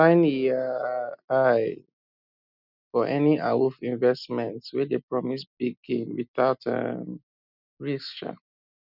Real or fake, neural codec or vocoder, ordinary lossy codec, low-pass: real; none; none; 5.4 kHz